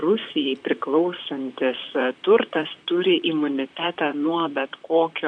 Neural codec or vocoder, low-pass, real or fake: vocoder, 44.1 kHz, 128 mel bands, Pupu-Vocoder; 9.9 kHz; fake